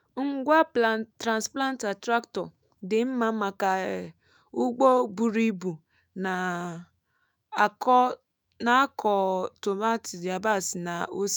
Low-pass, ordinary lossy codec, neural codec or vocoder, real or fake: none; none; autoencoder, 48 kHz, 128 numbers a frame, DAC-VAE, trained on Japanese speech; fake